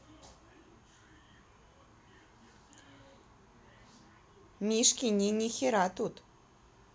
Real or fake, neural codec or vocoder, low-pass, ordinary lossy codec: real; none; none; none